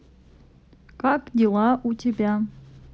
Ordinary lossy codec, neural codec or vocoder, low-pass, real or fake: none; none; none; real